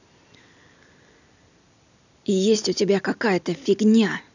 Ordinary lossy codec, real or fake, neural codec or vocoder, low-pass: none; real; none; 7.2 kHz